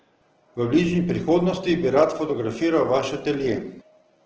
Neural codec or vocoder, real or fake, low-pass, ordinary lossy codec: none; real; 7.2 kHz; Opus, 16 kbps